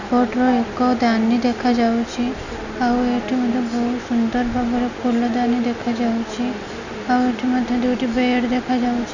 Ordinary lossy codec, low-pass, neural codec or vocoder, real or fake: none; 7.2 kHz; none; real